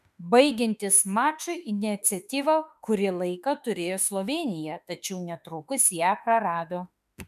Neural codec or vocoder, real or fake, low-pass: autoencoder, 48 kHz, 32 numbers a frame, DAC-VAE, trained on Japanese speech; fake; 14.4 kHz